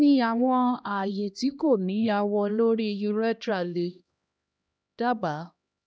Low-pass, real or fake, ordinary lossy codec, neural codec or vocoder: none; fake; none; codec, 16 kHz, 1 kbps, X-Codec, HuBERT features, trained on LibriSpeech